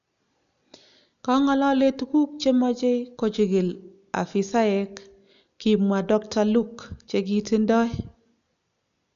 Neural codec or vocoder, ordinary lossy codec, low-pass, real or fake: none; none; 7.2 kHz; real